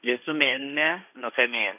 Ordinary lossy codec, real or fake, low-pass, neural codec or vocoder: none; fake; 3.6 kHz; codec, 16 kHz, 1.1 kbps, Voila-Tokenizer